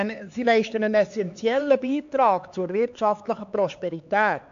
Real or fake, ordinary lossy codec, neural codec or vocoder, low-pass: fake; AAC, 64 kbps; codec, 16 kHz, 4 kbps, X-Codec, HuBERT features, trained on LibriSpeech; 7.2 kHz